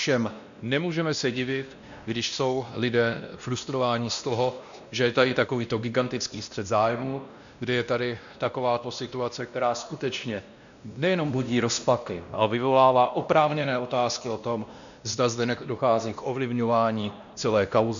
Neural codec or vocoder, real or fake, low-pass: codec, 16 kHz, 1 kbps, X-Codec, WavLM features, trained on Multilingual LibriSpeech; fake; 7.2 kHz